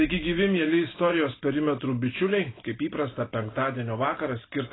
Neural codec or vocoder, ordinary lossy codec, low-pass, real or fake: none; AAC, 16 kbps; 7.2 kHz; real